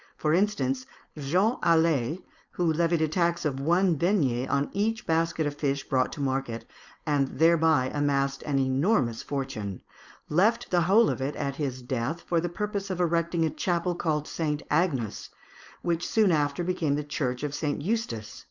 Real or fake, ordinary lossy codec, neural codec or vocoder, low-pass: fake; Opus, 64 kbps; codec, 16 kHz, 4.8 kbps, FACodec; 7.2 kHz